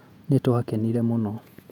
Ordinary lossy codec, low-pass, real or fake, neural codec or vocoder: none; 19.8 kHz; fake; vocoder, 48 kHz, 128 mel bands, Vocos